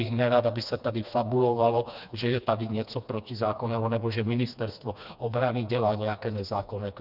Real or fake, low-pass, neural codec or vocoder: fake; 5.4 kHz; codec, 16 kHz, 2 kbps, FreqCodec, smaller model